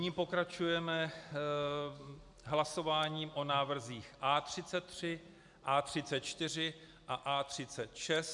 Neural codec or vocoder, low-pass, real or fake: none; 10.8 kHz; real